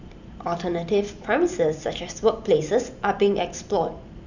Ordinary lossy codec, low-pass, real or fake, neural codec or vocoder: none; 7.2 kHz; fake; vocoder, 44.1 kHz, 128 mel bands every 512 samples, BigVGAN v2